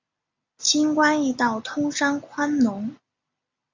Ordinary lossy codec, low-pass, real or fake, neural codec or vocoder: MP3, 64 kbps; 7.2 kHz; real; none